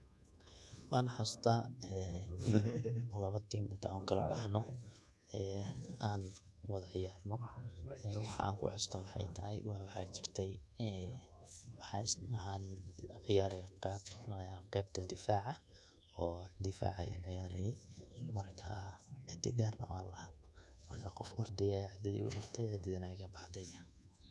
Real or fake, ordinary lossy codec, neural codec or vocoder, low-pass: fake; none; codec, 24 kHz, 1.2 kbps, DualCodec; none